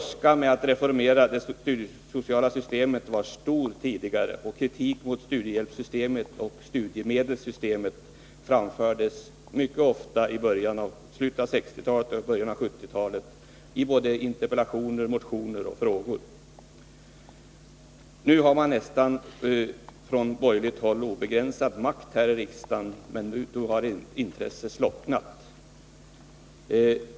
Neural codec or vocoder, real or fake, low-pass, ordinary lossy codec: none; real; none; none